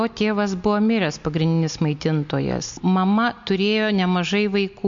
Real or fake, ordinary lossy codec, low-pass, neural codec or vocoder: real; MP3, 48 kbps; 7.2 kHz; none